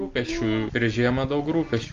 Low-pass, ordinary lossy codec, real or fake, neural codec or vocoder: 7.2 kHz; Opus, 32 kbps; real; none